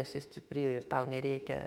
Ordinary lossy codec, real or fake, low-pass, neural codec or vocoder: MP3, 96 kbps; fake; 19.8 kHz; autoencoder, 48 kHz, 32 numbers a frame, DAC-VAE, trained on Japanese speech